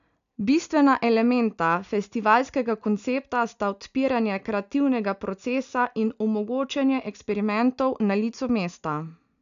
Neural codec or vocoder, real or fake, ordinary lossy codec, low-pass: none; real; none; 7.2 kHz